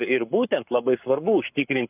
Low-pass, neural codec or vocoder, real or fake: 3.6 kHz; codec, 44.1 kHz, 7.8 kbps, Pupu-Codec; fake